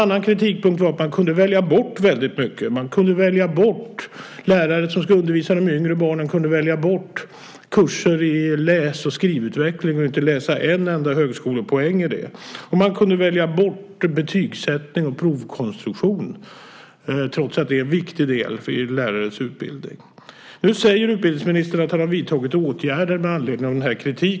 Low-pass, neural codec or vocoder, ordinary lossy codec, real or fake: none; none; none; real